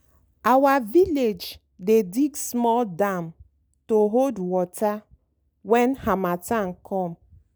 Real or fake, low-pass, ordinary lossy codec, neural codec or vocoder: real; none; none; none